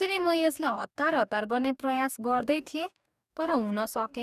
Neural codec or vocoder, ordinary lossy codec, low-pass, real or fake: codec, 44.1 kHz, 2.6 kbps, DAC; none; 14.4 kHz; fake